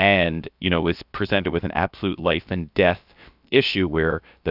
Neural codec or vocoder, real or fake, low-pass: codec, 16 kHz, about 1 kbps, DyCAST, with the encoder's durations; fake; 5.4 kHz